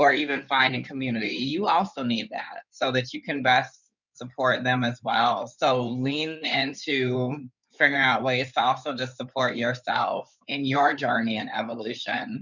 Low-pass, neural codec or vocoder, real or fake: 7.2 kHz; codec, 16 kHz in and 24 kHz out, 2.2 kbps, FireRedTTS-2 codec; fake